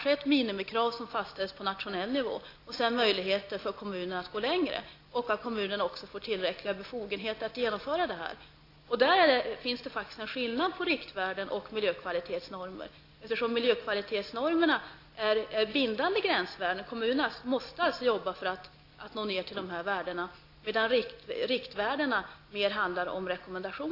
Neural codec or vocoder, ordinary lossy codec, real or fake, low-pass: none; AAC, 32 kbps; real; 5.4 kHz